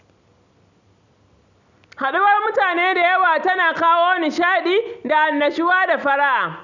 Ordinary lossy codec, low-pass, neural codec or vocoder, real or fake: none; 7.2 kHz; none; real